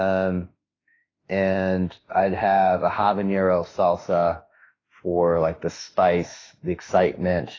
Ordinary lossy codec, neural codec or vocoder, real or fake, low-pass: AAC, 32 kbps; autoencoder, 48 kHz, 32 numbers a frame, DAC-VAE, trained on Japanese speech; fake; 7.2 kHz